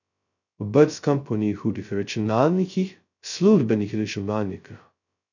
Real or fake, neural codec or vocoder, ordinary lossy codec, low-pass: fake; codec, 16 kHz, 0.2 kbps, FocalCodec; none; 7.2 kHz